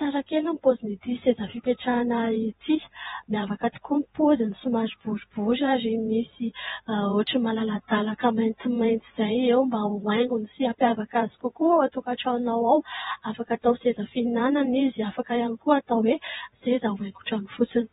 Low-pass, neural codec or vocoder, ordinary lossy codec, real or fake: 19.8 kHz; vocoder, 44.1 kHz, 128 mel bands every 512 samples, BigVGAN v2; AAC, 16 kbps; fake